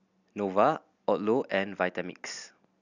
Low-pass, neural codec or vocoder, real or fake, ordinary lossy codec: 7.2 kHz; none; real; none